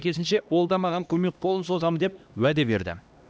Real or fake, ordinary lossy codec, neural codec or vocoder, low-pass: fake; none; codec, 16 kHz, 1 kbps, X-Codec, HuBERT features, trained on LibriSpeech; none